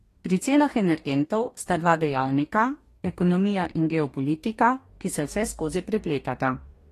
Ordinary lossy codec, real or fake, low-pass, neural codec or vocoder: AAC, 48 kbps; fake; 14.4 kHz; codec, 44.1 kHz, 2.6 kbps, DAC